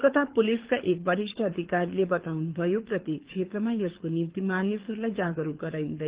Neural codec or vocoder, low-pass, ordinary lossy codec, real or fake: codec, 24 kHz, 6 kbps, HILCodec; 3.6 kHz; Opus, 16 kbps; fake